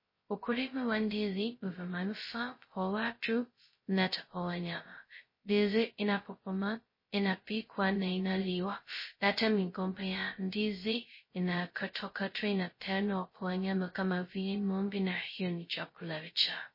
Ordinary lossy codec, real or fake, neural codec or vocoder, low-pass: MP3, 24 kbps; fake; codec, 16 kHz, 0.2 kbps, FocalCodec; 5.4 kHz